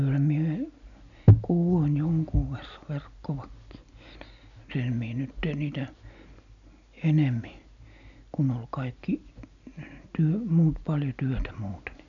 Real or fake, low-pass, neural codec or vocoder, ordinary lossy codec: real; 7.2 kHz; none; none